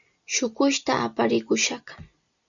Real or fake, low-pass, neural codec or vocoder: real; 7.2 kHz; none